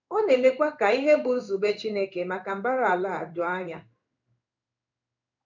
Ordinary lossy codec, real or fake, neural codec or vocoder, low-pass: none; fake; codec, 16 kHz in and 24 kHz out, 1 kbps, XY-Tokenizer; 7.2 kHz